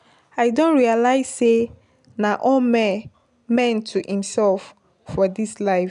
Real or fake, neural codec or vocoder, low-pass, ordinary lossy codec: real; none; 10.8 kHz; none